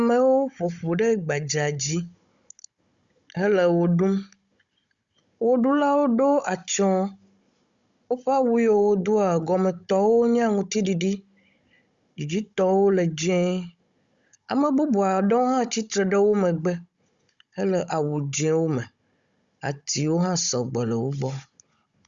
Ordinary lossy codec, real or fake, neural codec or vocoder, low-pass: Opus, 64 kbps; fake; codec, 16 kHz, 16 kbps, FunCodec, trained on Chinese and English, 50 frames a second; 7.2 kHz